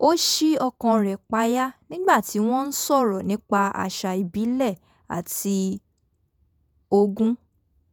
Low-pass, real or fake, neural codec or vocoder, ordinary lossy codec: none; fake; vocoder, 48 kHz, 128 mel bands, Vocos; none